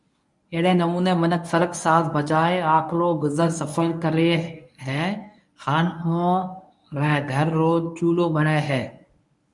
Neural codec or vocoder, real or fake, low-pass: codec, 24 kHz, 0.9 kbps, WavTokenizer, medium speech release version 1; fake; 10.8 kHz